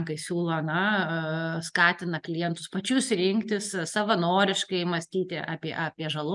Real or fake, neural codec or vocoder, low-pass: real; none; 10.8 kHz